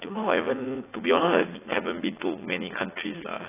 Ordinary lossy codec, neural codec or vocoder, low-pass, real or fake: AAC, 16 kbps; vocoder, 44.1 kHz, 80 mel bands, Vocos; 3.6 kHz; fake